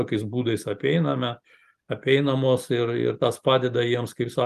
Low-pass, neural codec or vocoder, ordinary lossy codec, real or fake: 14.4 kHz; none; Opus, 32 kbps; real